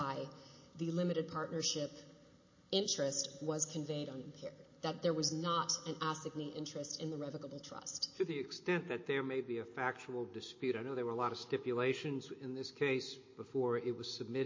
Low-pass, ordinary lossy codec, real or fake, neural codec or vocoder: 7.2 kHz; MP3, 32 kbps; real; none